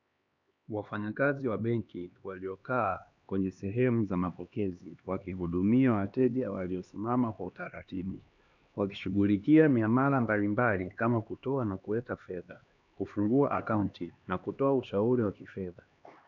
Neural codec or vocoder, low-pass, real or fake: codec, 16 kHz, 2 kbps, X-Codec, HuBERT features, trained on LibriSpeech; 7.2 kHz; fake